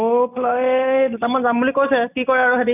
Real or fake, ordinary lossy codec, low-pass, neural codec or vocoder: real; none; 3.6 kHz; none